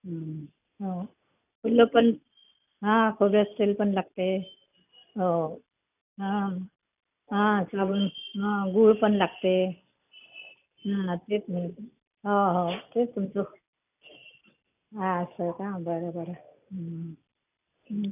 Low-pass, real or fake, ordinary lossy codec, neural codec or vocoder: 3.6 kHz; real; none; none